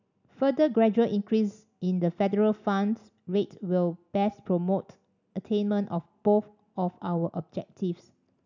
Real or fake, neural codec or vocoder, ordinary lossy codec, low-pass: real; none; none; 7.2 kHz